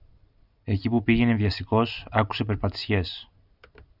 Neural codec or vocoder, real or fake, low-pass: none; real; 5.4 kHz